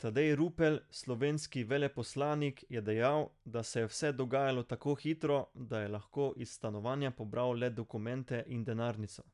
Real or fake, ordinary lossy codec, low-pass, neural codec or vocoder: real; none; 10.8 kHz; none